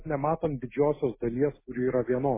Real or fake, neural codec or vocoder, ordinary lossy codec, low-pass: real; none; MP3, 16 kbps; 3.6 kHz